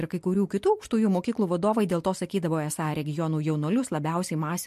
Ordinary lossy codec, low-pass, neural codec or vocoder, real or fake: MP3, 64 kbps; 14.4 kHz; none; real